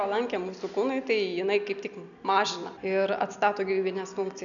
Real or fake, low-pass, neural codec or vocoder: real; 7.2 kHz; none